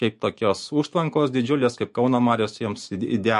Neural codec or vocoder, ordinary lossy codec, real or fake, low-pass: autoencoder, 48 kHz, 128 numbers a frame, DAC-VAE, trained on Japanese speech; MP3, 48 kbps; fake; 14.4 kHz